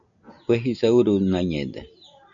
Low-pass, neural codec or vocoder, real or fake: 7.2 kHz; none; real